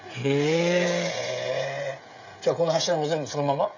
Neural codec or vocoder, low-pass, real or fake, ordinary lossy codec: codec, 16 kHz, 16 kbps, FreqCodec, smaller model; 7.2 kHz; fake; none